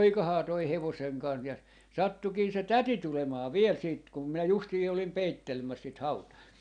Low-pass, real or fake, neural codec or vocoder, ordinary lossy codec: 9.9 kHz; real; none; none